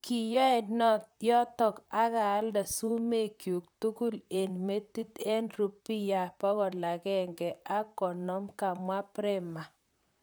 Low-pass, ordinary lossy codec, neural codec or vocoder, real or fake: none; none; vocoder, 44.1 kHz, 128 mel bands, Pupu-Vocoder; fake